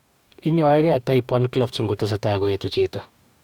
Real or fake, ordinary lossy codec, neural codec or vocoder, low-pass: fake; none; codec, 44.1 kHz, 2.6 kbps, DAC; 19.8 kHz